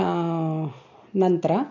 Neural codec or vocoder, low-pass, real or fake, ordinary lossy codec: none; 7.2 kHz; real; none